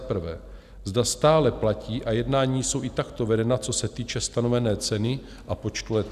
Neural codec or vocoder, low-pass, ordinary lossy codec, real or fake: none; 14.4 kHz; Opus, 64 kbps; real